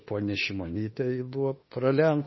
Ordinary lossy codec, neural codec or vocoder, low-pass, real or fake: MP3, 24 kbps; autoencoder, 48 kHz, 32 numbers a frame, DAC-VAE, trained on Japanese speech; 7.2 kHz; fake